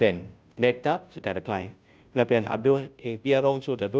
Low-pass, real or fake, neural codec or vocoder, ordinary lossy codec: none; fake; codec, 16 kHz, 0.5 kbps, FunCodec, trained on Chinese and English, 25 frames a second; none